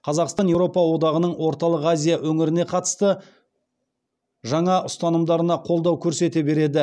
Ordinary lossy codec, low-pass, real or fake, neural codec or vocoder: none; none; real; none